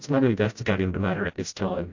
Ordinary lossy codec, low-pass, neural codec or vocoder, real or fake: AAC, 48 kbps; 7.2 kHz; codec, 16 kHz, 0.5 kbps, FreqCodec, smaller model; fake